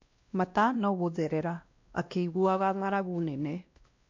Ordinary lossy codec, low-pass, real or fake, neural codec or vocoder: MP3, 48 kbps; 7.2 kHz; fake; codec, 16 kHz, 1 kbps, X-Codec, WavLM features, trained on Multilingual LibriSpeech